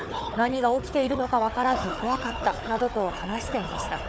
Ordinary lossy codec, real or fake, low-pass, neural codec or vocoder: none; fake; none; codec, 16 kHz, 4 kbps, FunCodec, trained on Chinese and English, 50 frames a second